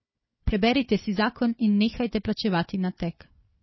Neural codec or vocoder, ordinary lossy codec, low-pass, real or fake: codec, 16 kHz, 4 kbps, FunCodec, trained on Chinese and English, 50 frames a second; MP3, 24 kbps; 7.2 kHz; fake